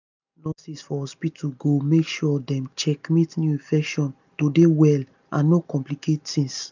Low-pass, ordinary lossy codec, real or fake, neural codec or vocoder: 7.2 kHz; none; real; none